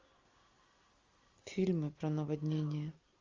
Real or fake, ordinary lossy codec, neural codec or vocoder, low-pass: real; Opus, 32 kbps; none; 7.2 kHz